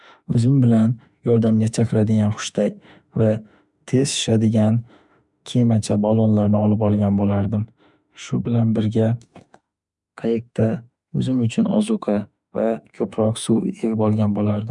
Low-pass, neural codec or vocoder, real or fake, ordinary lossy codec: 10.8 kHz; autoencoder, 48 kHz, 32 numbers a frame, DAC-VAE, trained on Japanese speech; fake; none